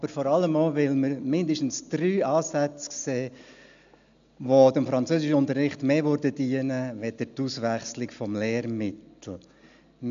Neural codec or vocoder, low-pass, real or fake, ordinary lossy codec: none; 7.2 kHz; real; MP3, 64 kbps